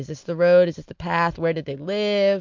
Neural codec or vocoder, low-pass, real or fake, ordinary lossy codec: none; 7.2 kHz; real; MP3, 64 kbps